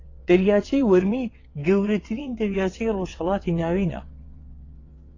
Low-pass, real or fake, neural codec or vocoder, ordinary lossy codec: 7.2 kHz; fake; vocoder, 22.05 kHz, 80 mel bands, WaveNeXt; AAC, 32 kbps